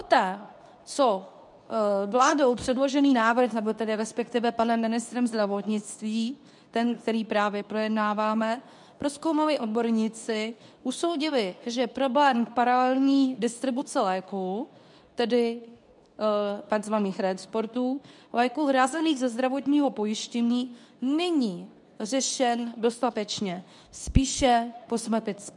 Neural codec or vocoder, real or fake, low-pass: codec, 24 kHz, 0.9 kbps, WavTokenizer, medium speech release version 2; fake; 10.8 kHz